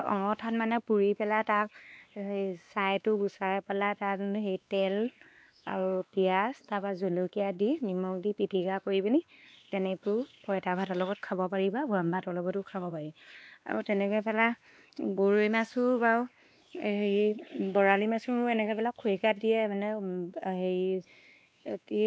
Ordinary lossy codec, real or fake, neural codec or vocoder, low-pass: none; fake; codec, 16 kHz, 2 kbps, X-Codec, WavLM features, trained on Multilingual LibriSpeech; none